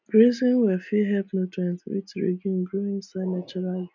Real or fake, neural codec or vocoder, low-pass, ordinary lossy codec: real; none; 7.2 kHz; none